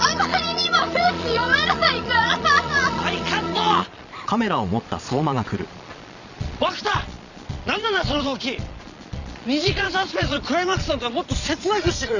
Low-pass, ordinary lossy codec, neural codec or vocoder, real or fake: 7.2 kHz; none; vocoder, 22.05 kHz, 80 mel bands, Vocos; fake